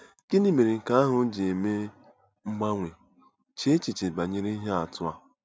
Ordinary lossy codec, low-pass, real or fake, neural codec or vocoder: none; none; real; none